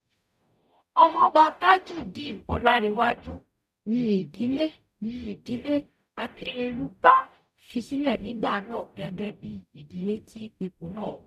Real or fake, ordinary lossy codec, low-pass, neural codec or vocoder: fake; none; 14.4 kHz; codec, 44.1 kHz, 0.9 kbps, DAC